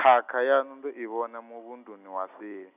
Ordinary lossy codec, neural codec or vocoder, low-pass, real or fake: none; none; 3.6 kHz; real